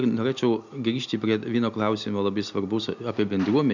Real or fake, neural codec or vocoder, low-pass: real; none; 7.2 kHz